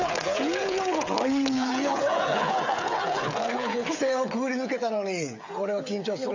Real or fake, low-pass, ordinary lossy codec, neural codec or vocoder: fake; 7.2 kHz; AAC, 48 kbps; codec, 16 kHz, 16 kbps, FreqCodec, smaller model